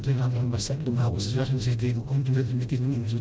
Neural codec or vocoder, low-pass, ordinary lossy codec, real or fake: codec, 16 kHz, 0.5 kbps, FreqCodec, smaller model; none; none; fake